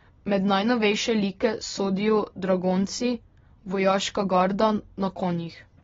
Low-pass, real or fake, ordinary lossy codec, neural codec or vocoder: 7.2 kHz; real; AAC, 24 kbps; none